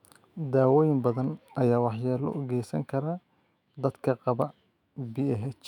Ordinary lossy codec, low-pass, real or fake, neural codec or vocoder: none; 19.8 kHz; fake; autoencoder, 48 kHz, 128 numbers a frame, DAC-VAE, trained on Japanese speech